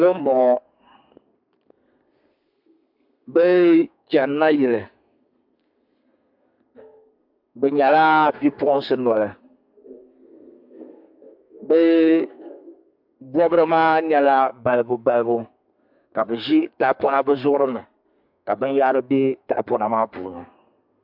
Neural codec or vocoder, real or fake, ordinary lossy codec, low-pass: codec, 32 kHz, 1.9 kbps, SNAC; fake; MP3, 48 kbps; 5.4 kHz